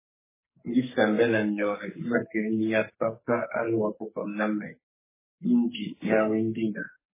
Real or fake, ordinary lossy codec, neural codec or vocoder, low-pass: fake; MP3, 16 kbps; codec, 44.1 kHz, 2.6 kbps, SNAC; 3.6 kHz